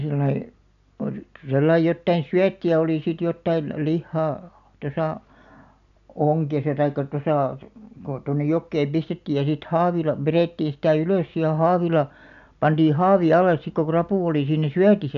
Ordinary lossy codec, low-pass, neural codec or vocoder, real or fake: none; 7.2 kHz; none; real